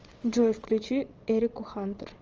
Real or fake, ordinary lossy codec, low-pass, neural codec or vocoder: fake; Opus, 24 kbps; 7.2 kHz; vocoder, 22.05 kHz, 80 mel bands, WaveNeXt